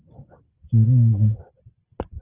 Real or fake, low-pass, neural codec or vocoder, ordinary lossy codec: real; 3.6 kHz; none; Opus, 16 kbps